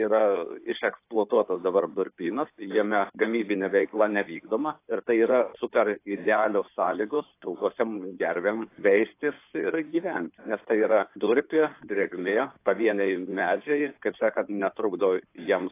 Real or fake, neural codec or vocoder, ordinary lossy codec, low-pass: fake; codec, 16 kHz in and 24 kHz out, 2.2 kbps, FireRedTTS-2 codec; AAC, 24 kbps; 3.6 kHz